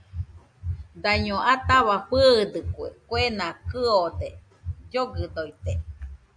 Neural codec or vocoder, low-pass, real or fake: none; 9.9 kHz; real